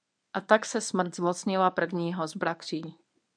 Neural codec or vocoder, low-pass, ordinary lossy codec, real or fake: codec, 24 kHz, 0.9 kbps, WavTokenizer, medium speech release version 1; 9.9 kHz; MP3, 96 kbps; fake